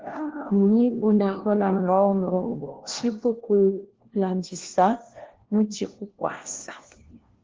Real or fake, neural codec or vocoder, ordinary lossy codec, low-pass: fake; codec, 16 kHz, 1 kbps, FunCodec, trained on LibriTTS, 50 frames a second; Opus, 16 kbps; 7.2 kHz